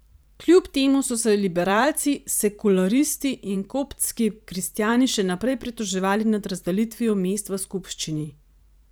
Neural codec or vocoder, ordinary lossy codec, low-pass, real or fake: vocoder, 44.1 kHz, 128 mel bands every 512 samples, BigVGAN v2; none; none; fake